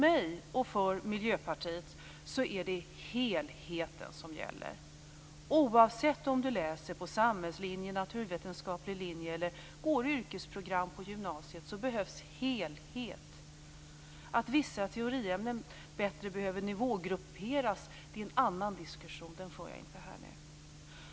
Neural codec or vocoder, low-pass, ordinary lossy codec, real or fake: none; none; none; real